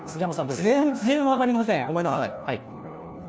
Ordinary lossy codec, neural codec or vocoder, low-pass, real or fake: none; codec, 16 kHz, 1 kbps, FunCodec, trained on LibriTTS, 50 frames a second; none; fake